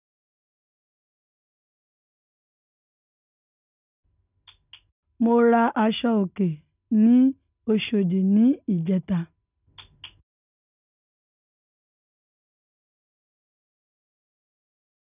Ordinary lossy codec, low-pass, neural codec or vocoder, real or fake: none; 3.6 kHz; none; real